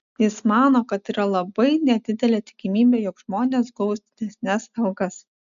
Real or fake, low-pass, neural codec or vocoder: real; 7.2 kHz; none